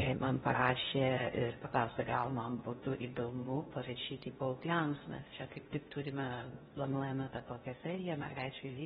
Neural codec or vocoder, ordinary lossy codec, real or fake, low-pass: codec, 16 kHz in and 24 kHz out, 0.6 kbps, FocalCodec, streaming, 4096 codes; AAC, 16 kbps; fake; 10.8 kHz